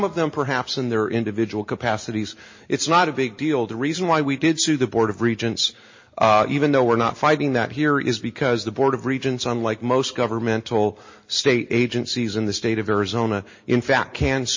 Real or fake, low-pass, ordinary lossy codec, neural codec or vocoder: real; 7.2 kHz; MP3, 32 kbps; none